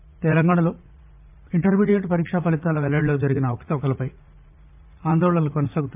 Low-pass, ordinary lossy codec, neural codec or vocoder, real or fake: 3.6 kHz; MP3, 32 kbps; vocoder, 44.1 kHz, 80 mel bands, Vocos; fake